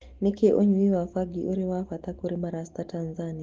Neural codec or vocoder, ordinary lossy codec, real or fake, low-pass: none; Opus, 16 kbps; real; 7.2 kHz